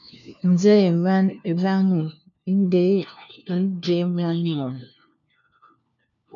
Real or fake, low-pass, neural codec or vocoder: fake; 7.2 kHz; codec, 16 kHz, 1 kbps, FunCodec, trained on LibriTTS, 50 frames a second